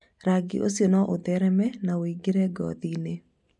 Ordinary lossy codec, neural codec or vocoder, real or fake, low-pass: none; none; real; 10.8 kHz